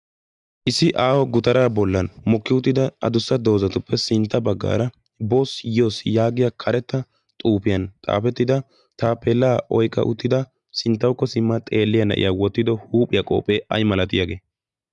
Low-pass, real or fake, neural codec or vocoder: 10.8 kHz; real; none